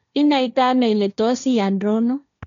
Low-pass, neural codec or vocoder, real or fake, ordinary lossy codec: 7.2 kHz; codec, 16 kHz, 1.1 kbps, Voila-Tokenizer; fake; none